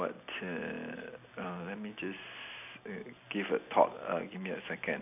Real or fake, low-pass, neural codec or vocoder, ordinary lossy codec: real; 3.6 kHz; none; none